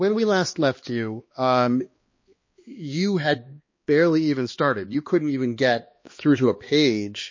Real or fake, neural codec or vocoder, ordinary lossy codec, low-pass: fake; codec, 16 kHz, 2 kbps, X-Codec, HuBERT features, trained on balanced general audio; MP3, 32 kbps; 7.2 kHz